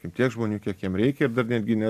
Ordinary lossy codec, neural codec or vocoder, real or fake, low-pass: AAC, 96 kbps; none; real; 14.4 kHz